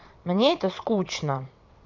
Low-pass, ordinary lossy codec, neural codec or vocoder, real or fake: 7.2 kHz; MP3, 64 kbps; vocoder, 22.05 kHz, 80 mel bands, WaveNeXt; fake